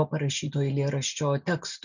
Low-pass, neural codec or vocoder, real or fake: 7.2 kHz; none; real